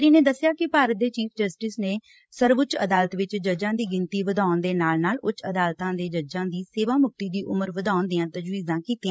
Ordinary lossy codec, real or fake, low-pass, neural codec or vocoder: none; fake; none; codec, 16 kHz, 16 kbps, FreqCodec, larger model